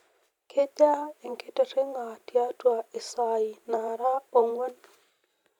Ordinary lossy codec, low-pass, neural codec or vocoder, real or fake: none; 19.8 kHz; vocoder, 44.1 kHz, 128 mel bands every 512 samples, BigVGAN v2; fake